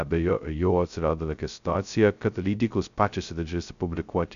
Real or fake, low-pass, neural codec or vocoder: fake; 7.2 kHz; codec, 16 kHz, 0.2 kbps, FocalCodec